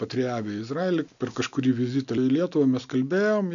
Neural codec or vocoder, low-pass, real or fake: none; 7.2 kHz; real